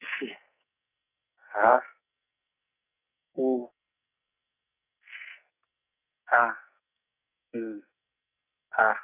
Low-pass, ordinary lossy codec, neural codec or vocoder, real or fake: 3.6 kHz; none; codec, 44.1 kHz, 2.6 kbps, SNAC; fake